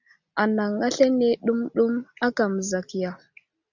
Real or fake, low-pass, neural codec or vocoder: real; 7.2 kHz; none